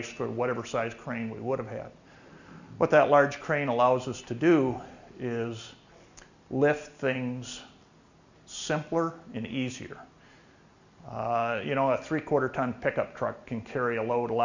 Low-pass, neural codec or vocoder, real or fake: 7.2 kHz; none; real